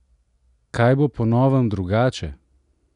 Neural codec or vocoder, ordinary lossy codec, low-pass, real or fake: none; none; 10.8 kHz; real